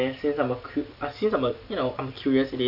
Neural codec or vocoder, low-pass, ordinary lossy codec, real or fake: none; 5.4 kHz; none; real